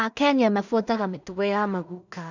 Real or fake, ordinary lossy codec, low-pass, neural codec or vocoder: fake; none; 7.2 kHz; codec, 16 kHz in and 24 kHz out, 0.4 kbps, LongCat-Audio-Codec, two codebook decoder